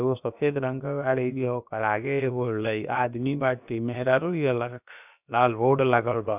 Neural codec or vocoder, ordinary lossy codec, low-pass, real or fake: codec, 16 kHz, about 1 kbps, DyCAST, with the encoder's durations; none; 3.6 kHz; fake